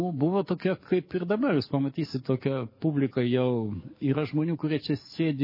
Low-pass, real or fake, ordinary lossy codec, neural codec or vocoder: 5.4 kHz; fake; MP3, 24 kbps; codec, 44.1 kHz, 7.8 kbps, DAC